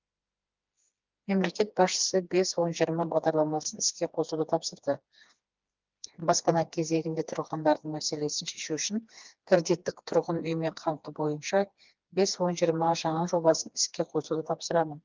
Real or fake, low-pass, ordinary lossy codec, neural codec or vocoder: fake; 7.2 kHz; Opus, 32 kbps; codec, 16 kHz, 2 kbps, FreqCodec, smaller model